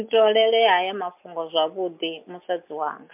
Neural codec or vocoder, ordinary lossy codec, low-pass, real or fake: none; MP3, 32 kbps; 3.6 kHz; real